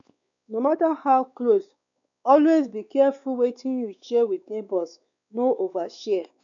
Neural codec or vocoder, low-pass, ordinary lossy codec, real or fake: codec, 16 kHz, 4 kbps, X-Codec, WavLM features, trained on Multilingual LibriSpeech; 7.2 kHz; none; fake